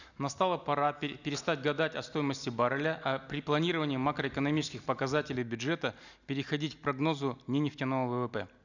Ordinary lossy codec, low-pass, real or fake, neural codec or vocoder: none; 7.2 kHz; real; none